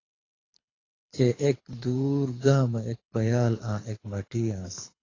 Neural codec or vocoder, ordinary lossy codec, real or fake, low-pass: codec, 24 kHz, 6 kbps, HILCodec; AAC, 32 kbps; fake; 7.2 kHz